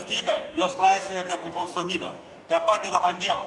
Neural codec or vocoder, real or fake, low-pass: codec, 44.1 kHz, 2.6 kbps, DAC; fake; 10.8 kHz